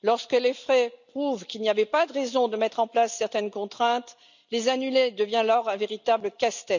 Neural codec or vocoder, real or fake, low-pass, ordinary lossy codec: none; real; 7.2 kHz; none